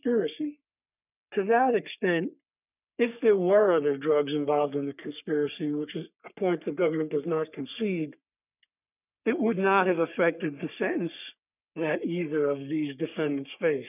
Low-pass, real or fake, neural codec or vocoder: 3.6 kHz; fake; codec, 44.1 kHz, 3.4 kbps, Pupu-Codec